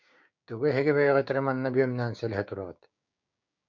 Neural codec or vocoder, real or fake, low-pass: codec, 16 kHz, 6 kbps, DAC; fake; 7.2 kHz